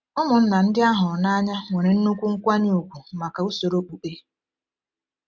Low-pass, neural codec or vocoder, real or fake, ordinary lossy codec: none; none; real; none